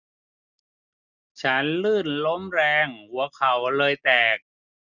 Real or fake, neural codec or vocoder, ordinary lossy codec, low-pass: real; none; none; 7.2 kHz